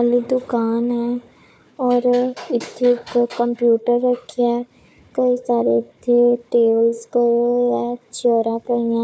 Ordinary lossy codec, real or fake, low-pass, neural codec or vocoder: none; fake; none; codec, 16 kHz, 4 kbps, FunCodec, trained on Chinese and English, 50 frames a second